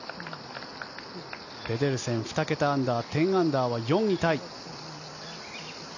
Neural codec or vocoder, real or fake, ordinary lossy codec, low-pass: none; real; none; 7.2 kHz